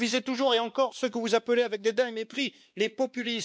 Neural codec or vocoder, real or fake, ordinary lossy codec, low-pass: codec, 16 kHz, 2 kbps, X-Codec, WavLM features, trained on Multilingual LibriSpeech; fake; none; none